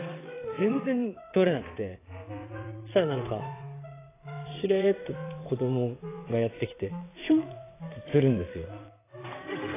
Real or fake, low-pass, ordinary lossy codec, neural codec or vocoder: fake; 3.6 kHz; AAC, 16 kbps; vocoder, 22.05 kHz, 80 mel bands, WaveNeXt